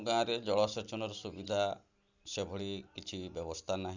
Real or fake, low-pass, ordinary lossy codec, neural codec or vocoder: real; 7.2 kHz; Opus, 64 kbps; none